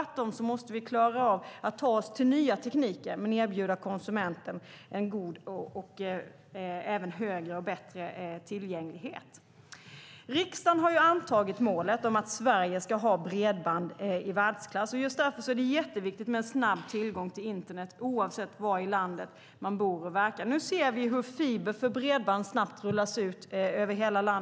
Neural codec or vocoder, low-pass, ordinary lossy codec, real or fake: none; none; none; real